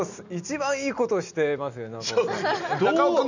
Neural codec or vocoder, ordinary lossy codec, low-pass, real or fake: none; none; 7.2 kHz; real